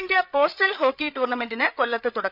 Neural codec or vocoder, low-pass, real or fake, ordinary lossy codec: codec, 16 kHz, 8 kbps, FreqCodec, larger model; 5.4 kHz; fake; none